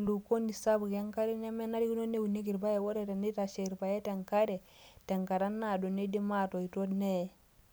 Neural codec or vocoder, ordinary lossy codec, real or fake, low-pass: none; none; real; none